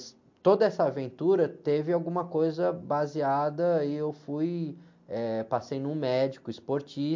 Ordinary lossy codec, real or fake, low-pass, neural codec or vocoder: MP3, 48 kbps; real; 7.2 kHz; none